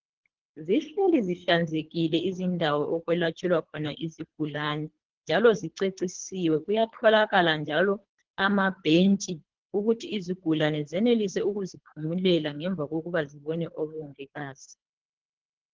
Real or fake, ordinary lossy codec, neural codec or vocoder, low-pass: fake; Opus, 16 kbps; codec, 24 kHz, 3 kbps, HILCodec; 7.2 kHz